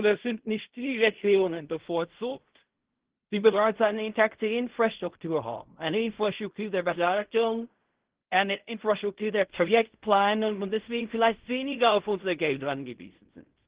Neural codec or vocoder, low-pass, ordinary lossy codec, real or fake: codec, 16 kHz in and 24 kHz out, 0.4 kbps, LongCat-Audio-Codec, fine tuned four codebook decoder; 3.6 kHz; Opus, 16 kbps; fake